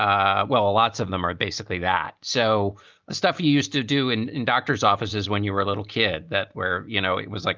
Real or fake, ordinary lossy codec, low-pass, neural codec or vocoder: real; Opus, 24 kbps; 7.2 kHz; none